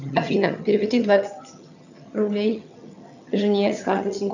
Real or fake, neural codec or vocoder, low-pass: fake; vocoder, 22.05 kHz, 80 mel bands, HiFi-GAN; 7.2 kHz